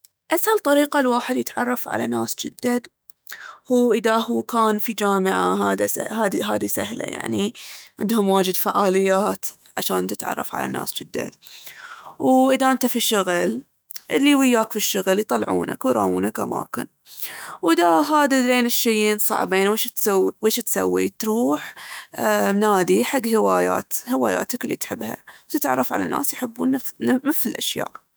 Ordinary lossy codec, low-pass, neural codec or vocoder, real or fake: none; none; autoencoder, 48 kHz, 128 numbers a frame, DAC-VAE, trained on Japanese speech; fake